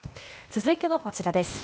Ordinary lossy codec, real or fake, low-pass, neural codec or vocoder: none; fake; none; codec, 16 kHz, 0.8 kbps, ZipCodec